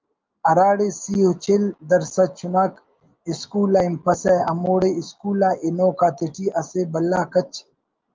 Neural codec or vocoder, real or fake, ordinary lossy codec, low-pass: none; real; Opus, 24 kbps; 7.2 kHz